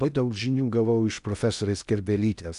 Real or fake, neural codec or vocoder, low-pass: fake; codec, 16 kHz in and 24 kHz out, 0.6 kbps, FocalCodec, streaming, 2048 codes; 10.8 kHz